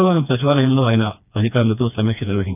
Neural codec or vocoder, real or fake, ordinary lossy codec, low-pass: codec, 16 kHz, 2 kbps, FreqCodec, smaller model; fake; none; 3.6 kHz